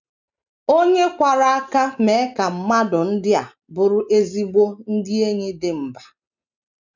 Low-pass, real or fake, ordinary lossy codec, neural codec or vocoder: 7.2 kHz; real; none; none